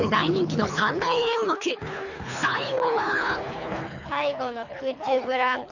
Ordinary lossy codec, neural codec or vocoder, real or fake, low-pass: none; codec, 24 kHz, 3 kbps, HILCodec; fake; 7.2 kHz